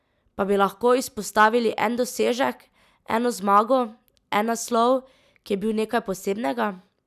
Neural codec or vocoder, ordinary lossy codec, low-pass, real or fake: none; none; 14.4 kHz; real